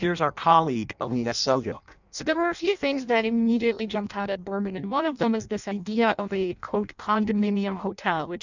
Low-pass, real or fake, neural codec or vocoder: 7.2 kHz; fake; codec, 16 kHz in and 24 kHz out, 0.6 kbps, FireRedTTS-2 codec